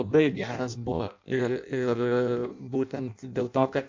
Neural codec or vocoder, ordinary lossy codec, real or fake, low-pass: codec, 16 kHz in and 24 kHz out, 0.6 kbps, FireRedTTS-2 codec; MP3, 64 kbps; fake; 7.2 kHz